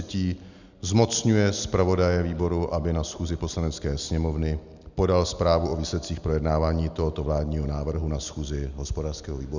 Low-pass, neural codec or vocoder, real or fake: 7.2 kHz; none; real